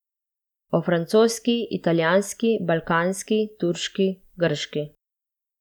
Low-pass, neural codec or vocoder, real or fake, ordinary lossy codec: 19.8 kHz; none; real; none